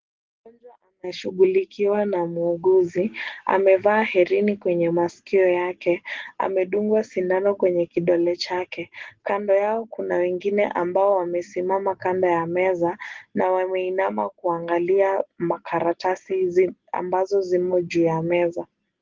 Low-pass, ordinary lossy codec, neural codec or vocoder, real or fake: 7.2 kHz; Opus, 16 kbps; none; real